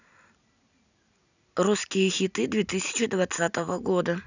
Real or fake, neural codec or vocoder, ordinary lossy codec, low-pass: real; none; none; 7.2 kHz